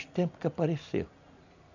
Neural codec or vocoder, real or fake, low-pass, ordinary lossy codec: none; real; 7.2 kHz; none